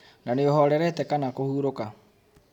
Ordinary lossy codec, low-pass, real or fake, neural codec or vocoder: none; 19.8 kHz; real; none